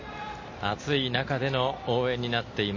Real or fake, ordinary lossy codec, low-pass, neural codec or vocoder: real; MP3, 32 kbps; 7.2 kHz; none